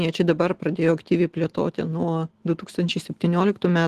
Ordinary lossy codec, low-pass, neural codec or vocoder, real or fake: Opus, 16 kbps; 14.4 kHz; none; real